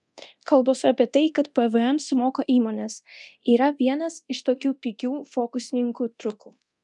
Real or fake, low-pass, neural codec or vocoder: fake; 10.8 kHz; codec, 24 kHz, 0.9 kbps, DualCodec